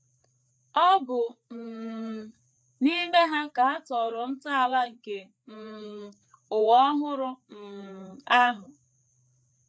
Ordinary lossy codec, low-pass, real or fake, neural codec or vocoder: none; none; fake; codec, 16 kHz, 4 kbps, FreqCodec, larger model